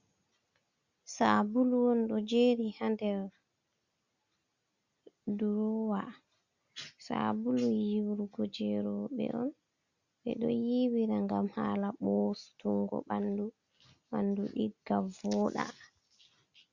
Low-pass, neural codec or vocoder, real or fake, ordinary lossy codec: 7.2 kHz; none; real; Opus, 64 kbps